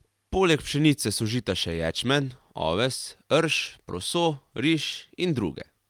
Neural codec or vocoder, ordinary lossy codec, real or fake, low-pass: none; Opus, 32 kbps; real; 19.8 kHz